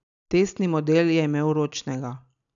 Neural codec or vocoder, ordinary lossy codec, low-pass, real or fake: none; none; 7.2 kHz; real